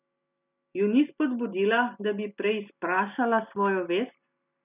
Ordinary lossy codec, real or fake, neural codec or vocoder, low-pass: none; real; none; 3.6 kHz